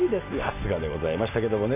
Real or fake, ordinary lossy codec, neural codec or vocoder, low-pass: real; none; none; 3.6 kHz